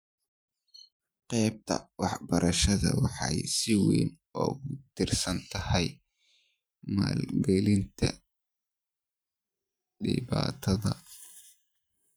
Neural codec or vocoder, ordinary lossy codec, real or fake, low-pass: vocoder, 44.1 kHz, 128 mel bands every 512 samples, BigVGAN v2; none; fake; none